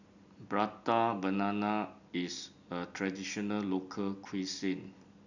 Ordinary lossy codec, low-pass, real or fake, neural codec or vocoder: none; 7.2 kHz; real; none